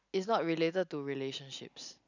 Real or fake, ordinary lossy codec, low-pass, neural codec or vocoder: real; none; 7.2 kHz; none